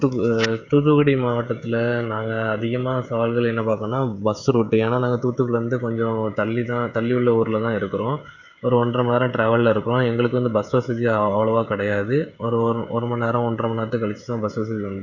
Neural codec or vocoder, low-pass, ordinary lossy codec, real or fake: codec, 16 kHz, 16 kbps, FreqCodec, smaller model; 7.2 kHz; none; fake